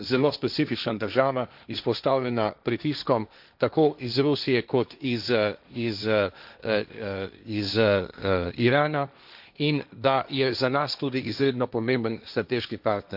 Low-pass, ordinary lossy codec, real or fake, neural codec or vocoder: 5.4 kHz; none; fake; codec, 16 kHz, 1.1 kbps, Voila-Tokenizer